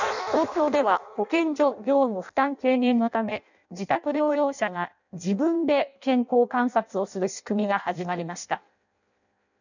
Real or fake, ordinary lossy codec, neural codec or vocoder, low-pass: fake; none; codec, 16 kHz in and 24 kHz out, 0.6 kbps, FireRedTTS-2 codec; 7.2 kHz